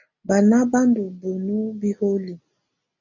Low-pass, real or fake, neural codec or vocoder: 7.2 kHz; real; none